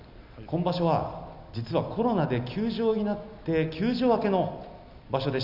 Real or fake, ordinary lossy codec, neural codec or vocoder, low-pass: real; none; none; 5.4 kHz